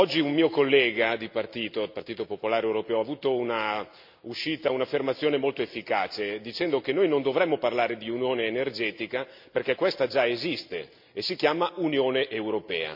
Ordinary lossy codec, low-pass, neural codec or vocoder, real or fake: MP3, 48 kbps; 5.4 kHz; none; real